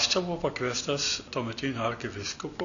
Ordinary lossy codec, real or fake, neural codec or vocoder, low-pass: MP3, 96 kbps; real; none; 7.2 kHz